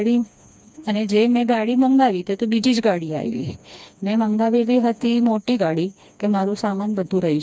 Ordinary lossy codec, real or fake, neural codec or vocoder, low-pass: none; fake; codec, 16 kHz, 2 kbps, FreqCodec, smaller model; none